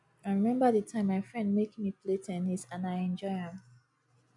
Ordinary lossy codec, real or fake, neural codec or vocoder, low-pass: none; real; none; 10.8 kHz